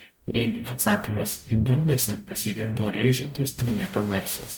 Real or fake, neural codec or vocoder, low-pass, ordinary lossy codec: fake; codec, 44.1 kHz, 0.9 kbps, DAC; 19.8 kHz; MP3, 96 kbps